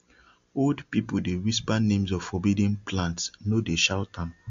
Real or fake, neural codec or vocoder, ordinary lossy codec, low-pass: real; none; MP3, 48 kbps; 7.2 kHz